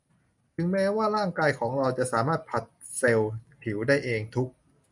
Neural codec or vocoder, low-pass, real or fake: none; 10.8 kHz; real